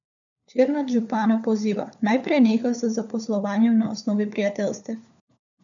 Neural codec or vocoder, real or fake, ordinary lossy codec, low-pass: codec, 16 kHz, 4 kbps, FunCodec, trained on LibriTTS, 50 frames a second; fake; none; 7.2 kHz